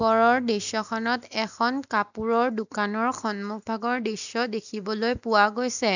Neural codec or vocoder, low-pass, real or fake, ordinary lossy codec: none; 7.2 kHz; real; none